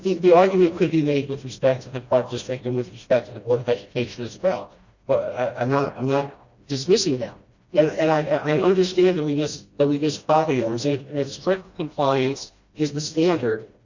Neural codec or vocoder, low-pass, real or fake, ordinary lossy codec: codec, 16 kHz, 1 kbps, FreqCodec, smaller model; 7.2 kHz; fake; Opus, 64 kbps